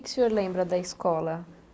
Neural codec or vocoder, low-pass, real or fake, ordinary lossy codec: none; none; real; none